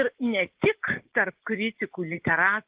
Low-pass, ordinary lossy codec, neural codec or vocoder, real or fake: 3.6 kHz; Opus, 16 kbps; none; real